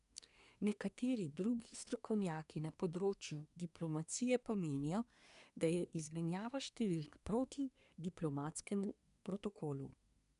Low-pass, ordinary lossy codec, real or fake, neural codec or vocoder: 10.8 kHz; none; fake; codec, 24 kHz, 1 kbps, SNAC